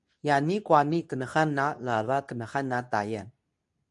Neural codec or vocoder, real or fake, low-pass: codec, 24 kHz, 0.9 kbps, WavTokenizer, medium speech release version 2; fake; 10.8 kHz